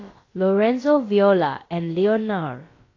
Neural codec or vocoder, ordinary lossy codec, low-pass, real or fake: codec, 16 kHz, about 1 kbps, DyCAST, with the encoder's durations; AAC, 32 kbps; 7.2 kHz; fake